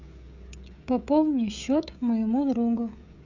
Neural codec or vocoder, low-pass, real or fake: codec, 16 kHz, 16 kbps, FreqCodec, smaller model; 7.2 kHz; fake